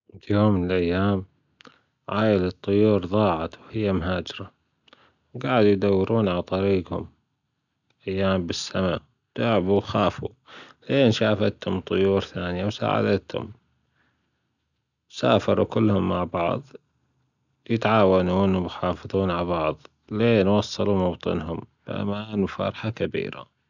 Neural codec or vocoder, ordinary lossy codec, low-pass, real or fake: none; none; 7.2 kHz; real